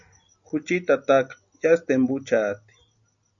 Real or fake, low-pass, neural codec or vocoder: real; 7.2 kHz; none